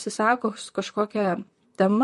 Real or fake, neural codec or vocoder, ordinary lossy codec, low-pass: fake; vocoder, 44.1 kHz, 128 mel bands, Pupu-Vocoder; MP3, 48 kbps; 14.4 kHz